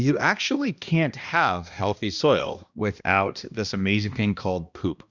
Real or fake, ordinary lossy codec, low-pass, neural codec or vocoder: fake; Opus, 64 kbps; 7.2 kHz; codec, 16 kHz, 1 kbps, X-Codec, HuBERT features, trained on balanced general audio